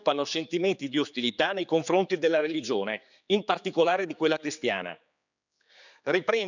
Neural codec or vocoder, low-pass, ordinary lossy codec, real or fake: codec, 16 kHz, 4 kbps, X-Codec, HuBERT features, trained on general audio; 7.2 kHz; none; fake